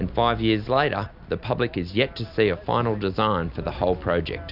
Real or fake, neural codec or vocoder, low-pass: real; none; 5.4 kHz